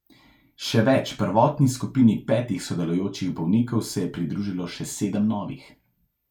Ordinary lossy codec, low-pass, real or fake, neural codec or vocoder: none; 19.8 kHz; real; none